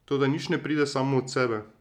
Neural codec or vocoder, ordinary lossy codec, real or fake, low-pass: none; none; real; 19.8 kHz